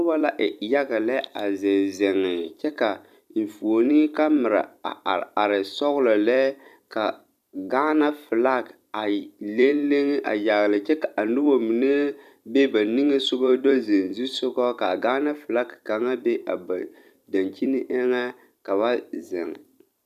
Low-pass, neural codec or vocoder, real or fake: 14.4 kHz; vocoder, 44.1 kHz, 128 mel bands every 256 samples, BigVGAN v2; fake